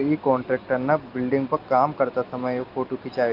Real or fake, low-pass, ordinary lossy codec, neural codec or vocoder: real; 5.4 kHz; Opus, 24 kbps; none